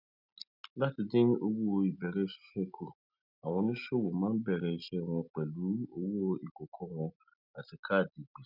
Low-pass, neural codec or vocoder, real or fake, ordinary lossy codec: 5.4 kHz; none; real; none